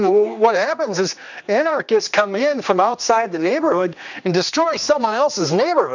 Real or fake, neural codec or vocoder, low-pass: fake; codec, 16 kHz, 2 kbps, X-Codec, HuBERT features, trained on general audio; 7.2 kHz